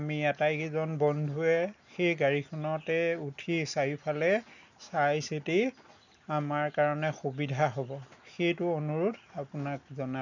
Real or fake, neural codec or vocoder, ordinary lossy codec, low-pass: real; none; none; 7.2 kHz